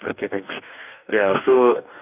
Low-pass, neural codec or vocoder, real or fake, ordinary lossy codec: 3.6 kHz; codec, 44.1 kHz, 2.6 kbps, DAC; fake; none